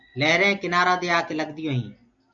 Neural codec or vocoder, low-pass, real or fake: none; 7.2 kHz; real